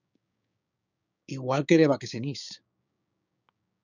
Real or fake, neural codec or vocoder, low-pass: fake; codec, 16 kHz, 6 kbps, DAC; 7.2 kHz